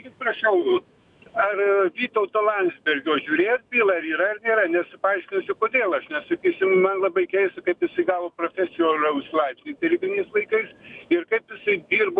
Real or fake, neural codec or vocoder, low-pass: fake; autoencoder, 48 kHz, 128 numbers a frame, DAC-VAE, trained on Japanese speech; 10.8 kHz